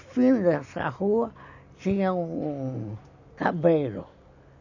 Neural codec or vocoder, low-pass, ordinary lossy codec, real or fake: none; 7.2 kHz; none; real